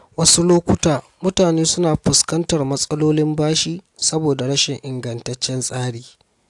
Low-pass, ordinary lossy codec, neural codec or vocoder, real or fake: 10.8 kHz; AAC, 64 kbps; none; real